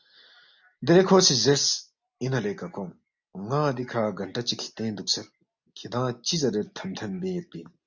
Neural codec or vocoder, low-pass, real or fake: none; 7.2 kHz; real